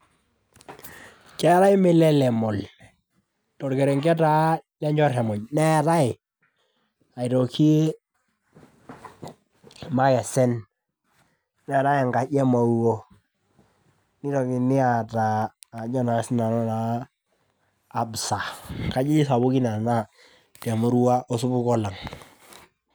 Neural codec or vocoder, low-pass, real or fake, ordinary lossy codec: none; none; real; none